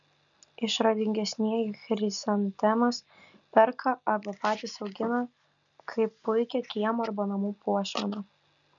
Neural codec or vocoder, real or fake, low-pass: none; real; 7.2 kHz